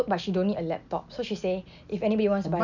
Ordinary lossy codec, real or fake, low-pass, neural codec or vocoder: none; real; 7.2 kHz; none